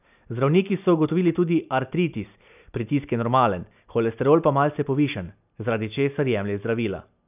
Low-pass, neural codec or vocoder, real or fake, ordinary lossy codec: 3.6 kHz; none; real; none